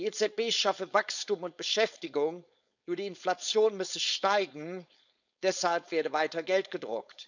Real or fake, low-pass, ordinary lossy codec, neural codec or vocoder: fake; 7.2 kHz; none; codec, 16 kHz, 4.8 kbps, FACodec